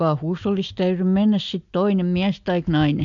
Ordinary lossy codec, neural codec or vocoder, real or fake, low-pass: MP3, 64 kbps; none; real; 7.2 kHz